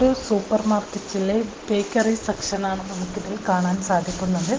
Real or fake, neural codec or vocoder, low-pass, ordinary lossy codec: real; none; 7.2 kHz; Opus, 16 kbps